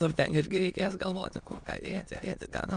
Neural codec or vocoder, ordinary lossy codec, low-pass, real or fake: autoencoder, 22.05 kHz, a latent of 192 numbers a frame, VITS, trained on many speakers; MP3, 64 kbps; 9.9 kHz; fake